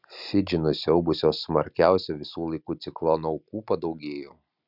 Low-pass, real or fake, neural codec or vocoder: 5.4 kHz; real; none